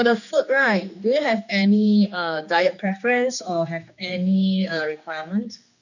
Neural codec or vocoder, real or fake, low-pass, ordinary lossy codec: codec, 16 kHz, 2 kbps, X-Codec, HuBERT features, trained on general audio; fake; 7.2 kHz; none